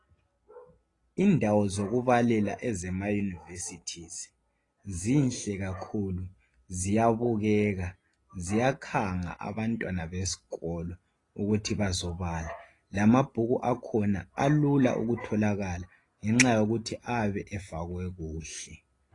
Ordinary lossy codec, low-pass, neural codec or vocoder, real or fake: AAC, 32 kbps; 10.8 kHz; none; real